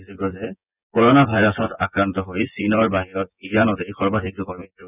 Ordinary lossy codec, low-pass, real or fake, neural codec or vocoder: none; 3.6 kHz; fake; vocoder, 24 kHz, 100 mel bands, Vocos